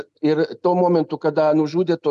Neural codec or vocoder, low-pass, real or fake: none; 10.8 kHz; real